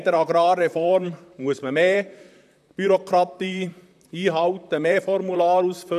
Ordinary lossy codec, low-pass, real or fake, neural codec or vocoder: none; 14.4 kHz; fake; vocoder, 44.1 kHz, 128 mel bands, Pupu-Vocoder